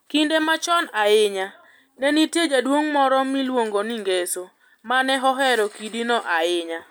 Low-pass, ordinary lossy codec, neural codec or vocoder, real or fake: none; none; none; real